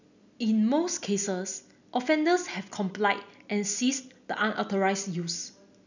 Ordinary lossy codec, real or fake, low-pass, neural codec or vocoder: none; real; 7.2 kHz; none